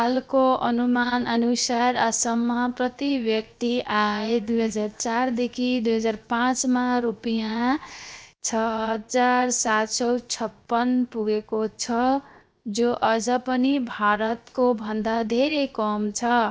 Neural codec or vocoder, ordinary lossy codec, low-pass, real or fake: codec, 16 kHz, 0.7 kbps, FocalCodec; none; none; fake